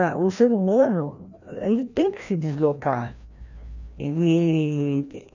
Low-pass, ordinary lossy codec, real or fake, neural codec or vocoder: 7.2 kHz; none; fake; codec, 16 kHz, 1 kbps, FreqCodec, larger model